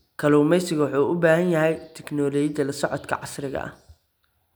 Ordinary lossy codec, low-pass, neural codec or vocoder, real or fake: none; none; none; real